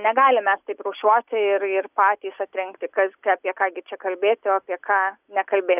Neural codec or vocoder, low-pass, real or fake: none; 3.6 kHz; real